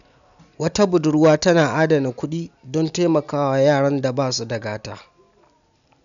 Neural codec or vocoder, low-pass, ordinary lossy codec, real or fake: none; 7.2 kHz; MP3, 96 kbps; real